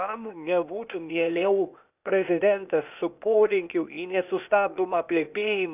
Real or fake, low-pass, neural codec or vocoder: fake; 3.6 kHz; codec, 16 kHz, 0.8 kbps, ZipCodec